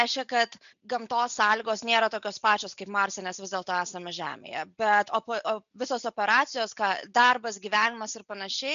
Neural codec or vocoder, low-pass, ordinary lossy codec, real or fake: none; 7.2 kHz; MP3, 96 kbps; real